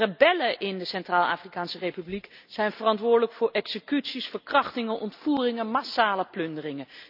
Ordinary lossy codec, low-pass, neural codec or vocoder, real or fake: none; 5.4 kHz; none; real